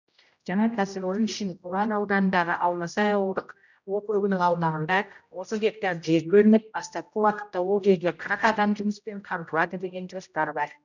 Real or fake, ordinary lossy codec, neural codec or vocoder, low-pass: fake; none; codec, 16 kHz, 0.5 kbps, X-Codec, HuBERT features, trained on general audio; 7.2 kHz